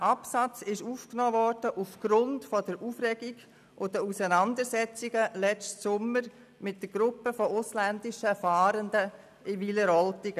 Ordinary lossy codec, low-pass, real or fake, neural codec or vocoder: none; 14.4 kHz; real; none